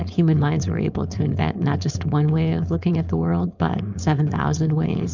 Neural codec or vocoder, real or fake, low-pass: codec, 16 kHz, 4.8 kbps, FACodec; fake; 7.2 kHz